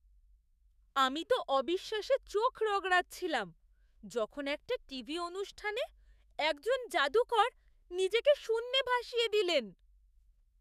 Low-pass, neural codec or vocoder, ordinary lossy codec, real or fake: 14.4 kHz; autoencoder, 48 kHz, 128 numbers a frame, DAC-VAE, trained on Japanese speech; none; fake